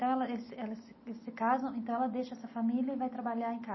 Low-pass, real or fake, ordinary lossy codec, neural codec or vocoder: 7.2 kHz; real; MP3, 24 kbps; none